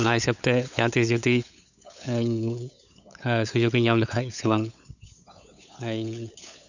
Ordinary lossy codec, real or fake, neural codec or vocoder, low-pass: none; fake; codec, 16 kHz, 8 kbps, FunCodec, trained on LibriTTS, 25 frames a second; 7.2 kHz